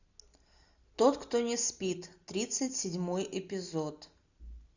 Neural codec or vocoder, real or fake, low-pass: none; real; 7.2 kHz